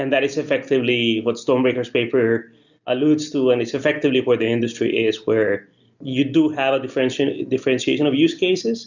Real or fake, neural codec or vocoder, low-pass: real; none; 7.2 kHz